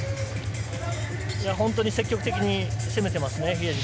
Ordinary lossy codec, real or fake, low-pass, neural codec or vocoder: none; real; none; none